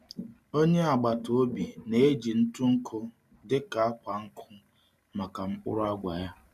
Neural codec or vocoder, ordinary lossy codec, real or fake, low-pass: none; none; real; 14.4 kHz